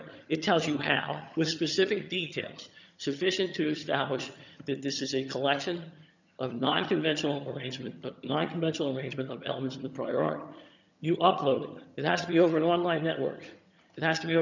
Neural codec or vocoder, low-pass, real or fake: vocoder, 22.05 kHz, 80 mel bands, HiFi-GAN; 7.2 kHz; fake